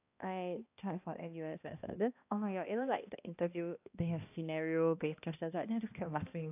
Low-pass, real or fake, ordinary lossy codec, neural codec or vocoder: 3.6 kHz; fake; AAC, 32 kbps; codec, 16 kHz, 1 kbps, X-Codec, HuBERT features, trained on balanced general audio